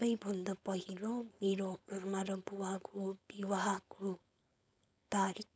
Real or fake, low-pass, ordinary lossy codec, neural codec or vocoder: fake; none; none; codec, 16 kHz, 4.8 kbps, FACodec